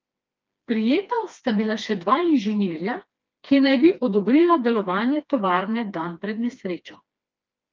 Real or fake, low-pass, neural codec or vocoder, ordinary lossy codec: fake; 7.2 kHz; codec, 16 kHz, 2 kbps, FreqCodec, smaller model; Opus, 32 kbps